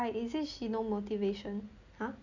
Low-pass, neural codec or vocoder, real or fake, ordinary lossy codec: 7.2 kHz; none; real; none